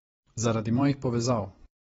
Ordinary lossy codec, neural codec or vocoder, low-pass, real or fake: AAC, 24 kbps; vocoder, 44.1 kHz, 128 mel bands every 512 samples, BigVGAN v2; 19.8 kHz; fake